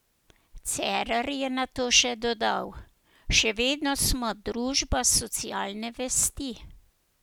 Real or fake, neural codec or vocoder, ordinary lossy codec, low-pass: real; none; none; none